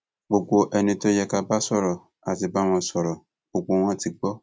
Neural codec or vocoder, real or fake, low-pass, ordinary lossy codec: none; real; none; none